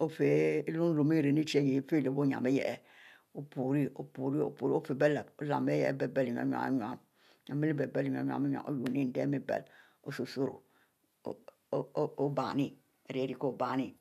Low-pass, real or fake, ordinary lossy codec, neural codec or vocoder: 14.4 kHz; real; none; none